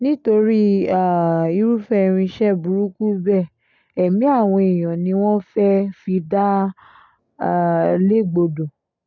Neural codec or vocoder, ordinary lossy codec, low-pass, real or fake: none; none; 7.2 kHz; real